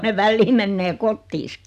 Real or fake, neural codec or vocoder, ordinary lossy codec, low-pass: real; none; none; 14.4 kHz